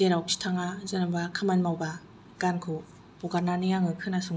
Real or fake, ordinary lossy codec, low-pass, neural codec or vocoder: real; none; none; none